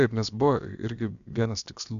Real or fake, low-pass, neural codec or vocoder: fake; 7.2 kHz; codec, 16 kHz, about 1 kbps, DyCAST, with the encoder's durations